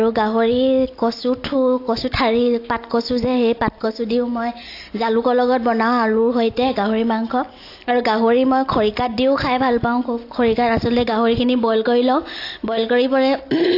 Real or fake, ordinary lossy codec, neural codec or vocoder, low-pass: real; AAC, 32 kbps; none; 5.4 kHz